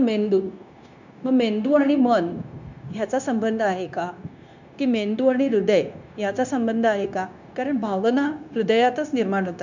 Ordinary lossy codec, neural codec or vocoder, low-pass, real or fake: none; codec, 16 kHz, 0.9 kbps, LongCat-Audio-Codec; 7.2 kHz; fake